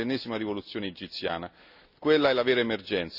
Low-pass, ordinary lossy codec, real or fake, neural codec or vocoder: 5.4 kHz; none; real; none